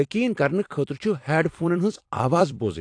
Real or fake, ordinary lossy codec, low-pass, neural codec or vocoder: fake; AAC, 48 kbps; 9.9 kHz; vocoder, 44.1 kHz, 128 mel bands every 256 samples, BigVGAN v2